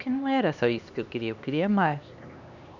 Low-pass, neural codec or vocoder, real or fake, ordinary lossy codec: 7.2 kHz; codec, 16 kHz, 2 kbps, X-Codec, HuBERT features, trained on LibriSpeech; fake; none